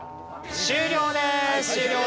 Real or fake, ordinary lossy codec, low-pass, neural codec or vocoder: real; none; none; none